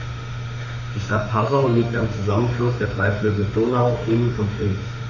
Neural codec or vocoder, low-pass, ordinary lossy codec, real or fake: autoencoder, 48 kHz, 32 numbers a frame, DAC-VAE, trained on Japanese speech; 7.2 kHz; none; fake